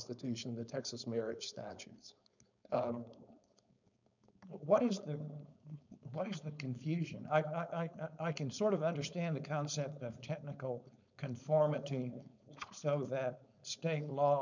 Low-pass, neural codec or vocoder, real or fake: 7.2 kHz; codec, 16 kHz, 4.8 kbps, FACodec; fake